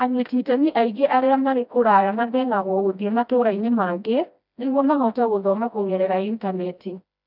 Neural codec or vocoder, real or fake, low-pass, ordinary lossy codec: codec, 16 kHz, 1 kbps, FreqCodec, smaller model; fake; 5.4 kHz; none